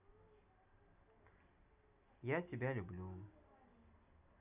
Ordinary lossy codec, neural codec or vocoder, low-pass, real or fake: none; none; 3.6 kHz; real